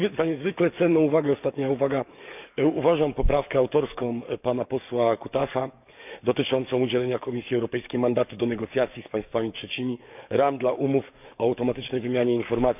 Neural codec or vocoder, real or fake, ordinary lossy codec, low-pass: codec, 44.1 kHz, 7.8 kbps, DAC; fake; none; 3.6 kHz